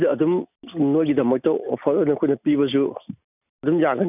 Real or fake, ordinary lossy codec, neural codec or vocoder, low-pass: real; none; none; 3.6 kHz